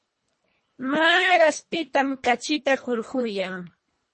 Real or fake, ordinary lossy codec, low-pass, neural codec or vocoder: fake; MP3, 32 kbps; 10.8 kHz; codec, 24 kHz, 1.5 kbps, HILCodec